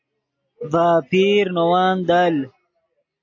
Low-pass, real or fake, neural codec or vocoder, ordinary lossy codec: 7.2 kHz; real; none; AAC, 48 kbps